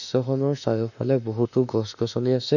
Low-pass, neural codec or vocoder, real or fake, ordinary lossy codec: 7.2 kHz; autoencoder, 48 kHz, 32 numbers a frame, DAC-VAE, trained on Japanese speech; fake; none